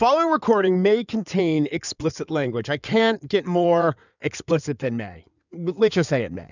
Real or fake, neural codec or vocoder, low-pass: fake; codec, 16 kHz in and 24 kHz out, 2.2 kbps, FireRedTTS-2 codec; 7.2 kHz